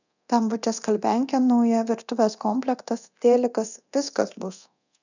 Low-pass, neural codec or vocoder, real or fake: 7.2 kHz; codec, 24 kHz, 0.9 kbps, DualCodec; fake